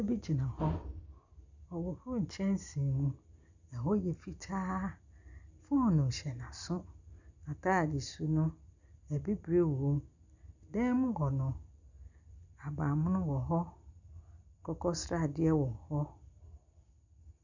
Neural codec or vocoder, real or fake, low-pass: none; real; 7.2 kHz